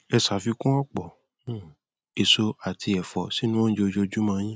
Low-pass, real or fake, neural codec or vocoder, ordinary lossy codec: none; real; none; none